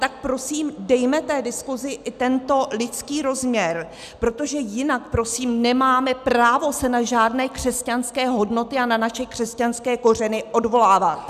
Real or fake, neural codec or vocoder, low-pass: real; none; 14.4 kHz